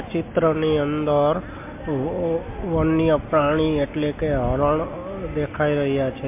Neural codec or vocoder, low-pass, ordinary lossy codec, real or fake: none; 3.6 kHz; MP3, 24 kbps; real